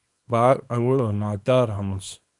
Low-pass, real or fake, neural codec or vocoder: 10.8 kHz; fake; codec, 24 kHz, 0.9 kbps, WavTokenizer, small release